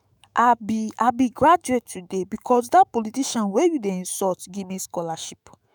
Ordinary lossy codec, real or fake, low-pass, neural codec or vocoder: none; fake; none; autoencoder, 48 kHz, 128 numbers a frame, DAC-VAE, trained on Japanese speech